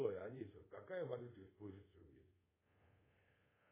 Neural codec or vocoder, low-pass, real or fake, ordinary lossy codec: codec, 24 kHz, 0.5 kbps, DualCodec; 3.6 kHz; fake; MP3, 16 kbps